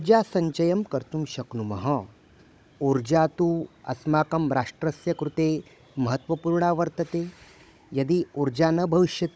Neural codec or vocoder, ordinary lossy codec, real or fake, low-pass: codec, 16 kHz, 16 kbps, FunCodec, trained on Chinese and English, 50 frames a second; none; fake; none